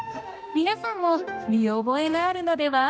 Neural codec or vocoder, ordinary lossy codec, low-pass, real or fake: codec, 16 kHz, 1 kbps, X-Codec, HuBERT features, trained on general audio; none; none; fake